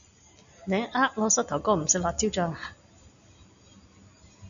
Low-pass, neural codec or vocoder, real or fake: 7.2 kHz; none; real